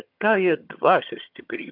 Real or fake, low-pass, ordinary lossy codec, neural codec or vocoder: fake; 5.4 kHz; MP3, 48 kbps; vocoder, 22.05 kHz, 80 mel bands, HiFi-GAN